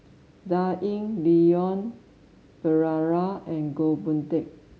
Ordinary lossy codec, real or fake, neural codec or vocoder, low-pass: none; real; none; none